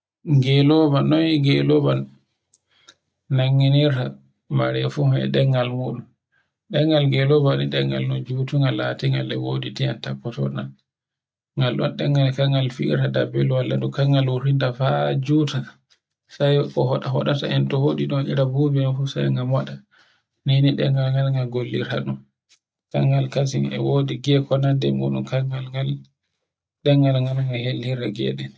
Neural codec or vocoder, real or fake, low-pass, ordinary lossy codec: none; real; none; none